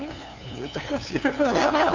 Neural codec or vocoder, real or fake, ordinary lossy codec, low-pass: codec, 16 kHz, 2 kbps, FunCodec, trained on LibriTTS, 25 frames a second; fake; Opus, 64 kbps; 7.2 kHz